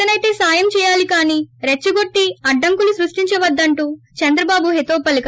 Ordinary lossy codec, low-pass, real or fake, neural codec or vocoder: none; none; real; none